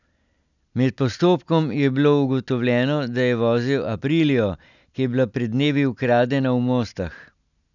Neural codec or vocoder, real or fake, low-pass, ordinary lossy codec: none; real; 7.2 kHz; none